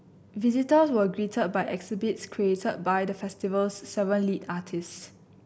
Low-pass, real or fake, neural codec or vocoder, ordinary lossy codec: none; real; none; none